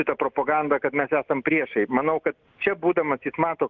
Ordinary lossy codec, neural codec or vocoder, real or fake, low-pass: Opus, 32 kbps; none; real; 7.2 kHz